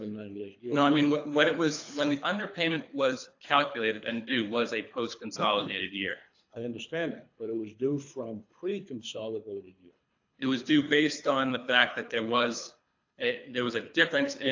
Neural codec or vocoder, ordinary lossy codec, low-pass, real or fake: codec, 24 kHz, 3 kbps, HILCodec; AAC, 48 kbps; 7.2 kHz; fake